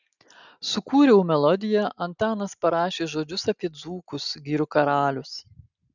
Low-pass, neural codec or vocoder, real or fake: 7.2 kHz; none; real